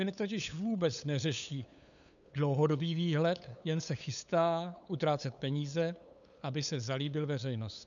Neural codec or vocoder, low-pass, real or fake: codec, 16 kHz, 8 kbps, FunCodec, trained on LibriTTS, 25 frames a second; 7.2 kHz; fake